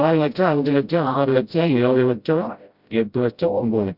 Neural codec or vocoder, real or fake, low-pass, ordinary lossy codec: codec, 16 kHz, 0.5 kbps, FreqCodec, smaller model; fake; 5.4 kHz; Opus, 64 kbps